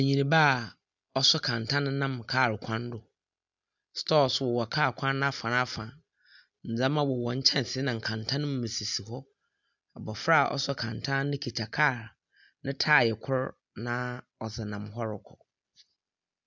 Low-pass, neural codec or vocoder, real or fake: 7.2 kHz; none; real